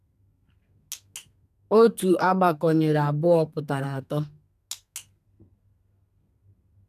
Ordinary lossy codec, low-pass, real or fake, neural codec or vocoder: none; 14.4 kHz; fake; codec, 32 kHz, 1.9 kbps, SNAC